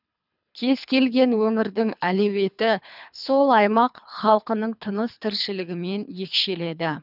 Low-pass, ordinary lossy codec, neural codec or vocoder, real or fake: 5.4 kHz; none; codec, 24 kHz, 3 kbps, HILCodec; fake